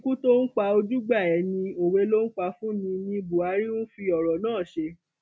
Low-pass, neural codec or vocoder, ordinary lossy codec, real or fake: none; none; none; real